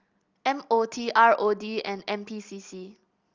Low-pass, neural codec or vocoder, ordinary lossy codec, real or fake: 7.2 kHz; none; Opus, 32 kbps; real